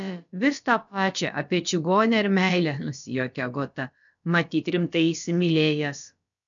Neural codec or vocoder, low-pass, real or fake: codec, 16 kHz, about 1 kbps, DyCAST, with the encoder's durations; 7.2 kHz; fake